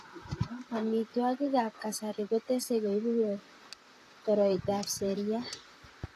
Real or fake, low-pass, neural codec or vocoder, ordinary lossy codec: fake; 14.4 kHz; vocoder, 44.1 kHz, 128 mel bands, Pupu-Vocoder; AAC, 48 kbps